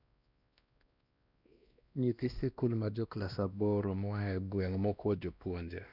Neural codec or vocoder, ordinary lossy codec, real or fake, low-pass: codec, 16 kHz, 1 kbps, X-Codec, WavLM features, trained on Multilingual LibriSpeech; none; fake; 5.4 kHz